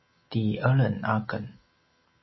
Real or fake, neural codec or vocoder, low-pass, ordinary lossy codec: real; none; 7.2 kHz; MP3, 24 kbps